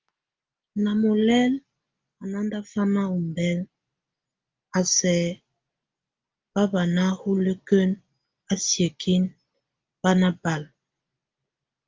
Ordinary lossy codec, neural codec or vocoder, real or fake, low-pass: Opus, 32 kbps; none; real; 7.2 kHz